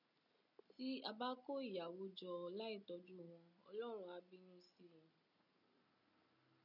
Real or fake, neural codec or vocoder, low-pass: real; none; 5.4 kHz